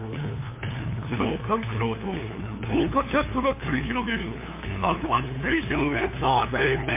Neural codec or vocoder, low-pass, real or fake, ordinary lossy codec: codec, 16 kHz, 2 kbps, FunCodec, trained on LibriTTS, 25 frames a second; 3.6 kHz; fake; MP3, 24 kbps